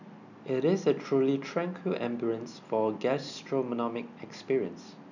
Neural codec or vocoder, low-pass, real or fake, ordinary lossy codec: none; 7.2 kHz; real; none